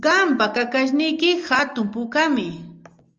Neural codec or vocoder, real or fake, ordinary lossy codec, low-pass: none; real; Opus, 32 kbps; 7.2 kHz